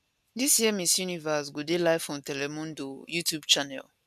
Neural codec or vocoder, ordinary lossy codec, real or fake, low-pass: none; none; real; 14.4 kHz